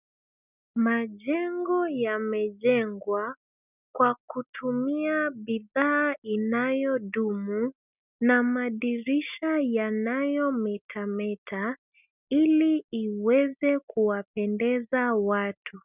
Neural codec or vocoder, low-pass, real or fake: none; 3.6 kHz; real